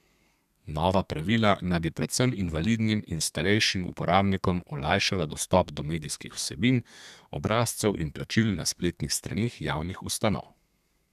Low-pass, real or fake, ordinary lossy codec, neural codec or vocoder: 14.4 kHz; fake; none; codec, 32 kHz, 1.9 kbps, SNAC